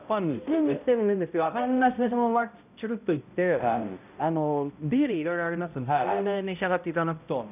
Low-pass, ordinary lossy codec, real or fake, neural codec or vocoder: 3.6 kHz; none; fake; codec, 16 kHz, 0.5 kbps, X-Codec, HuBERT features, trained on balanced general audio